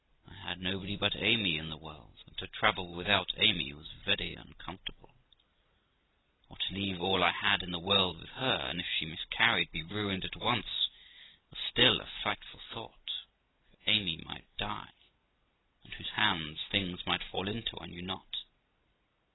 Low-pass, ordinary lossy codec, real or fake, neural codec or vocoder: 7.2 kHz; AAC, 16 kbps; real; none